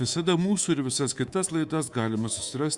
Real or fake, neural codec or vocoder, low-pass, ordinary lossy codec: fake; codec, 24 kHz, 3.1 kbps, DualCodec; 10.8 kHz; Opus, 64 kbps